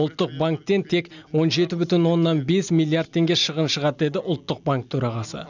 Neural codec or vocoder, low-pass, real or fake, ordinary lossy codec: none; 7.2 kHz; real; none